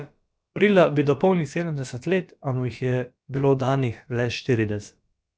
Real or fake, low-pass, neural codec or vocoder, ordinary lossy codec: fake; none; codec, 16 kHz, about 1 kbps, DyCAST, with the encoder's durations; none